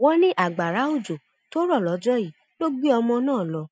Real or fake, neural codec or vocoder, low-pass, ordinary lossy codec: real; none; none; none